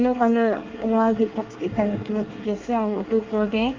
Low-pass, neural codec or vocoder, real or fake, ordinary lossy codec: 7.2 kHz; codec, 24 kHz, 1 kbps, SNAC; fake; Opus, 24 kbps